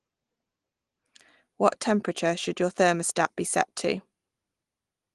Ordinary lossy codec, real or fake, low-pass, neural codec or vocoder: Opus, 24 kbps; real; 10.8 kHz; none